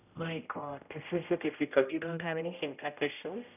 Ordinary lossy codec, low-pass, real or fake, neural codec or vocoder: none; 3.6 kHz; fake; codec, 16 kHz, 0.5 kbps, X-Codec, HuBERT features, trained on general audio